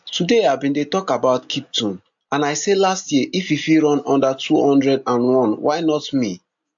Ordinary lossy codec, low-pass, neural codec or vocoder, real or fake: AAC, 64 kbps; 7.2 kHz; none; real